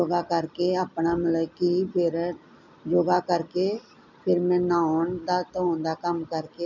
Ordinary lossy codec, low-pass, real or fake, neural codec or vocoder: none; 7.2 kHz; real; none